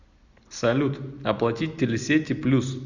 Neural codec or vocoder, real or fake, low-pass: none; real; 7.2 kHz